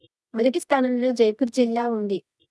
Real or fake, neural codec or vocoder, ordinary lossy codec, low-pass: fake; codec, 24 kHz, 0.9 kbps, WavTokenizer, medium music audio release; none; none